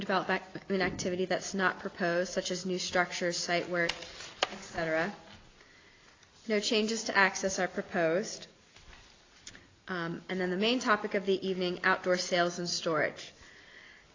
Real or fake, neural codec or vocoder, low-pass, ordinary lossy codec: real; none; 7.2 kHz; AAC, 32 kbps